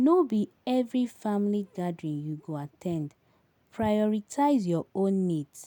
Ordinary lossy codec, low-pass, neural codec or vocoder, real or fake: none; 19.8 kHz; none; real